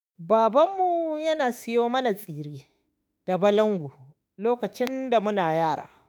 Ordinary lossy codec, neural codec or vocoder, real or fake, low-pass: none; autoencoder, 48 kHz, 32 numbers a frame, DAC-VAE, trained on Japanese speech; fake; none